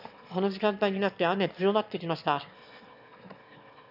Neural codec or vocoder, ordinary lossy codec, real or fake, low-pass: autoencoder, 22.05 kHz, a latent of 192 numbers a frame, VITS, trained on one speaker; none; fake; 5.4 kHz